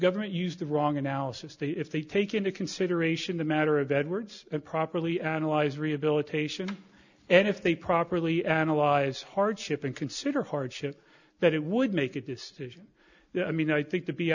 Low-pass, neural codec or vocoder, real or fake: 7.2 kHz; none; real